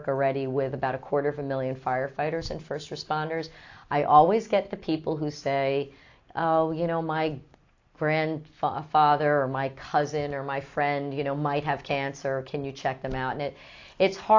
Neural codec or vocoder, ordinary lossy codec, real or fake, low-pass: none; AAC, 48 kbps; real; 7.2 kHz